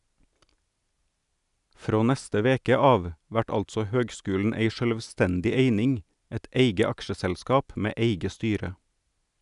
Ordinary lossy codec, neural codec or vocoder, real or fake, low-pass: none; none; real; 10.8 kHz